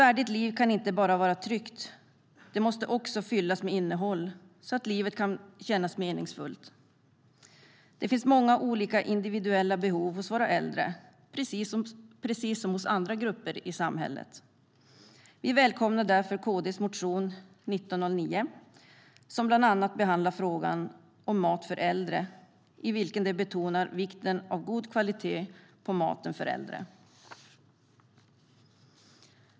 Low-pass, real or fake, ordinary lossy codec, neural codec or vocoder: none; real; none; none